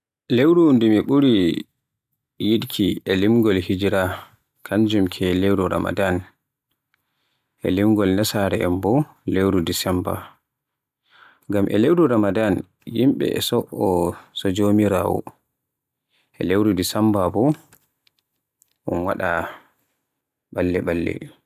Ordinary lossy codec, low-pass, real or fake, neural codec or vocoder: none; 14.4 kHz; real; none